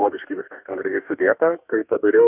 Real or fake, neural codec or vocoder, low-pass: fake; codec, 44.1 kHz, 2.6 kbps, DAC; 3.6 kHz